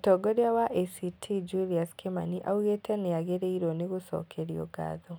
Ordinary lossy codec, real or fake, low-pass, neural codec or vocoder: none; real; none; none